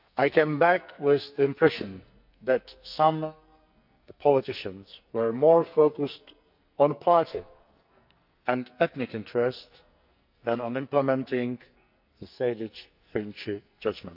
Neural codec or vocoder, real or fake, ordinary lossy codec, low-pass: codec, 44.1 kHz, 2.6 kbps, SNAC; fake; none; 5.4 kHz